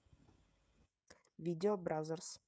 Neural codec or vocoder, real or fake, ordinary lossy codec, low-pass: codec, 16 kHz, 16 kbps, FreqCodec, smaller model; fake; none; none